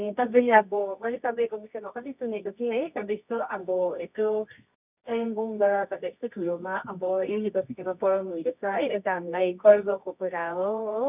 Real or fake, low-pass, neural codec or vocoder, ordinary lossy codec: fake; 3.6 kHz; codec, 24 kHz, 0.9 kbps, WavTokenizer, medium music audio release; none